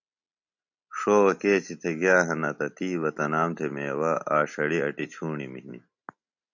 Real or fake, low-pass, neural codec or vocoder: real; 7.2 kHz; none